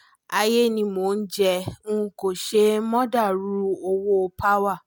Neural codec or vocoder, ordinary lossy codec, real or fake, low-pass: none; none; real; none